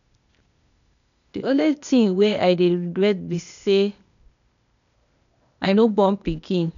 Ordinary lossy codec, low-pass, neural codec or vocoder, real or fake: none; 7.2 kHz; codec, 16 kHz, 0.8 kbps, ZipCodec; fake